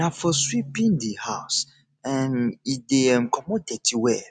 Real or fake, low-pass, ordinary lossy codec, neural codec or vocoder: real; none; none; none